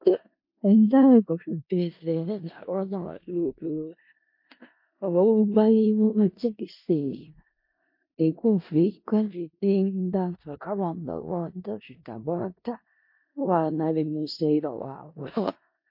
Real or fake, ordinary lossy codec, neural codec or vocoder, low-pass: fake; MP3, 32 kbps; codec, 16 kHz in and 24 kHz out, 0.4 kbps, LongCat-Audio-Codec, four codebook decoder; 5.4 kHz